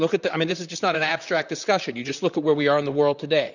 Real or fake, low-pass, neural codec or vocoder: fake; 7.2 kHz; vocoder, 44.1 kHz, 128 mel bands, Pupu-Vocoder